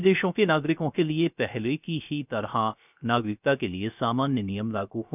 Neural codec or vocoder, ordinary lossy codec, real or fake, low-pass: codec, 16 kHz, 0.3 kbps, FocalCodec; none; fake; 3.6 kHz